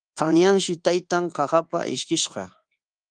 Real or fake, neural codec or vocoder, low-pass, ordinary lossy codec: fake; codec, 24 kHz, 1.2 kbps, DualCodec; 9.9 kHz; Opus, 24 kbps